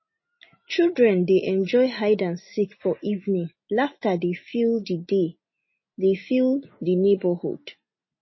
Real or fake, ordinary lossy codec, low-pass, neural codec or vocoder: real; MP3, 24 kbps; 7.2 kHz; none